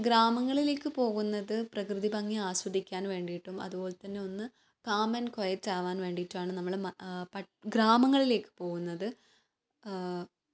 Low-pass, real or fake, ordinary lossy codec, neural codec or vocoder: none; real; none; none